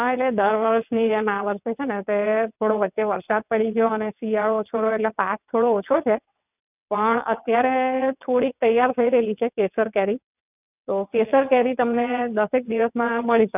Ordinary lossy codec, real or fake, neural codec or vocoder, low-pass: none; fake; vocoder, 22.05 kHz, 80 mel bands, WaveNeXt; 3.6 kHz